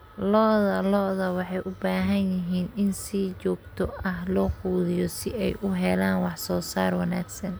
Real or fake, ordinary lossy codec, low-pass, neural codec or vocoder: real; none; none; none